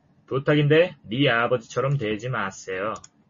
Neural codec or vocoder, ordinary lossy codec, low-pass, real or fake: none; MP3, 32 kbps; 7.2 kHz; real